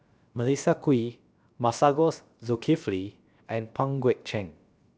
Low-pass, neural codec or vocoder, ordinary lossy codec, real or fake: none; codec, 16 kHz, 0.7 kbps, FocalCodec; none; fake